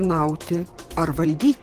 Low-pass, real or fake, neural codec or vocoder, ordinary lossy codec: 14.4 kHz; fake; vocoder, 44.1 kHz, 128 mel bands, Pupu-Vocoder; Opus, 16 kbps